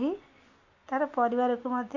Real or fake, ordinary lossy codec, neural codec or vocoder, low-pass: real; none; none; 7.2 kHz